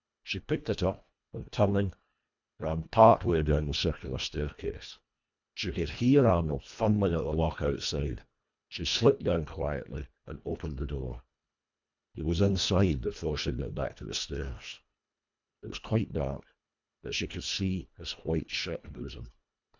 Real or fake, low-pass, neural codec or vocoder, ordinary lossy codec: fake; 7.2 kHz; codec, 24 kHz, 1.5 kbps, HILCodec; MP3, 64 kbps